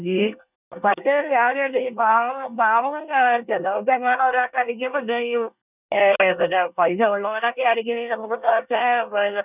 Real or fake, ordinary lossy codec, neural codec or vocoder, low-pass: fake; none; codec, 24 kHz, 1 kbps, SNAC; 3.6 kHz